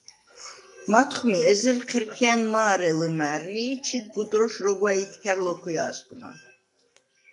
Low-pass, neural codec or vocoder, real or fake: 10.8 kHz; codec, 44.1 kHz, 2.6 kbps, SNAC; fake